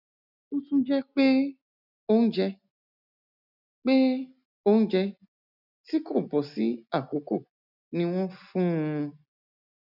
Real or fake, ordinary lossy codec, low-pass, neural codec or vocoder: real; none; 5.4 kHz; none